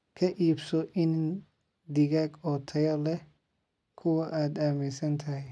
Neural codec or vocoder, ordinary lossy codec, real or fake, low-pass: none; none; real; none